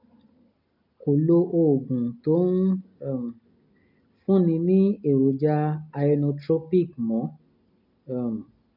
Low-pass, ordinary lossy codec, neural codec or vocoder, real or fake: 5.4 kHz; none; none; real